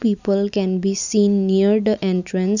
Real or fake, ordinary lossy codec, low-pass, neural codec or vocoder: real; none; 7.2 kHz; none